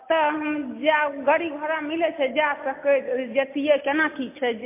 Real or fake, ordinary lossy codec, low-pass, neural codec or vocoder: real; MP3, 24 kbps; 3.6 kHz; none